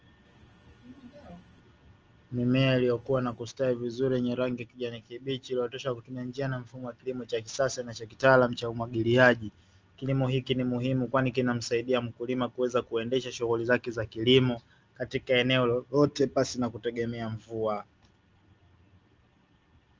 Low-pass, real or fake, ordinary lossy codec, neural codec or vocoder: 7.2 kHz; real; Opus, 24 kbps; none